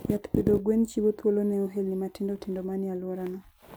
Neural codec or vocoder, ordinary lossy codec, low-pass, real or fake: none; none; none; real